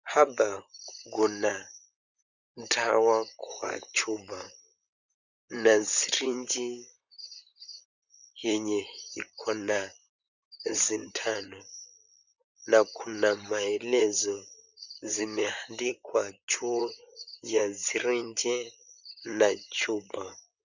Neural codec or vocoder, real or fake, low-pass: vocoder, 44.1 kHz, 128 mel bands, Pupu-Vocoder; fake; 7.2 kHz